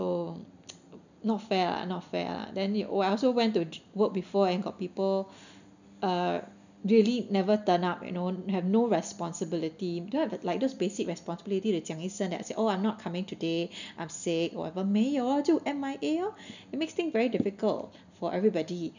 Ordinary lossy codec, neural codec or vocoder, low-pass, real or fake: none; none; 7.2 kHz; real